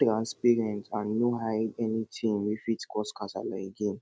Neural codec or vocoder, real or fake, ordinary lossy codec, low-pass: none; real; none; none